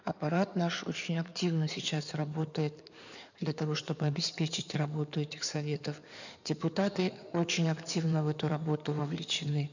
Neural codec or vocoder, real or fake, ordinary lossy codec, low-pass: codec, 16 kHz in and 24 kHz out, 2.2 kbps, FireRedTTS-2 codec; fake; none; 7.2 kHz